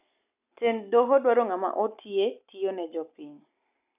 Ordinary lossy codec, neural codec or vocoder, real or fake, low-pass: none; none; real; 3.6 kHz